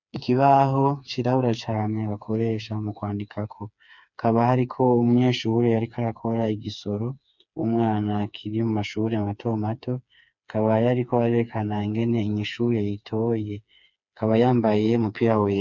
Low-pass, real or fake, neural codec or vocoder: 7.2 kHz; fake; codec, 16 kHz, 4 kbps, FreqCodec, smaller model